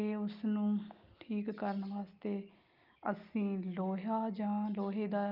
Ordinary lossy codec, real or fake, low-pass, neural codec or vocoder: Opus, 24 kbps; real; 5.4 kHz; none